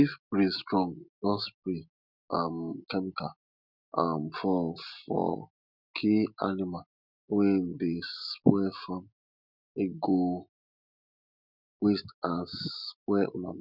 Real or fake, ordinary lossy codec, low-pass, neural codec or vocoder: real; none; 5.4 kHz; none